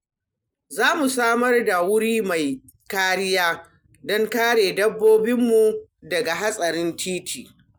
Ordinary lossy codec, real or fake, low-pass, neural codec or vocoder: none; real; none; none